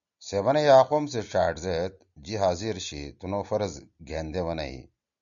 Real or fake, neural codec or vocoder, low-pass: real; none; 7.2 kHz